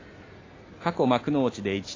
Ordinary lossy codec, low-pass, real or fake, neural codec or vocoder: AAC, 32 kbps; 7.2 kHz; real; none